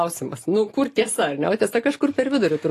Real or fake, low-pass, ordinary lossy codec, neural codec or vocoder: real; 14.4 kHz; AAC, 48 kbps; none